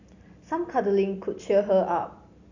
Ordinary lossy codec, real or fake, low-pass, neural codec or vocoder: none; real; 7.2 kHz; none